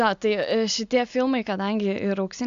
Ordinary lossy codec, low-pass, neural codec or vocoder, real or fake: AAC, 64 kbps; 7.2 kHz; none; real